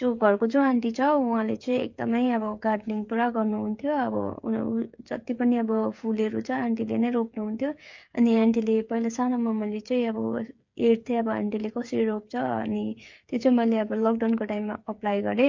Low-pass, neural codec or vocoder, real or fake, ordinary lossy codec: 7.2 kHz; codec, 16 kHz, 8 kbps, FreqCodec, smaller model; fake; MP3, 48 kbps